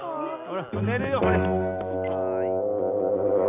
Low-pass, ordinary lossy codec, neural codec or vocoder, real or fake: 3.6 kHz; none; none; real